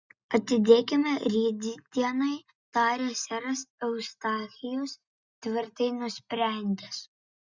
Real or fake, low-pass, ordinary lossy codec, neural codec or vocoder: real; 7.2 kHz; Opus, 64 kbps; none